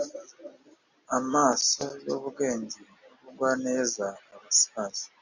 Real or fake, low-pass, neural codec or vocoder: real; 7.2 kHz; none